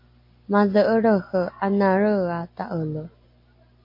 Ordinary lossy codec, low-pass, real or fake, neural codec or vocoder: MP3, 32 kbps; 5.4 kHz; real; none